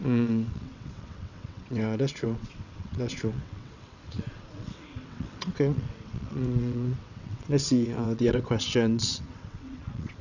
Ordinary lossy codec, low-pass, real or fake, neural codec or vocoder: none; 7.2 kHz; fake; vocoder, 22.05 kHz, 80 mel bands, WaveNeXt